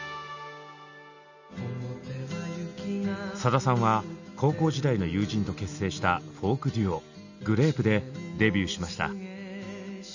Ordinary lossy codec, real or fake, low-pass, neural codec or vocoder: none; real; 7.2 kHz; none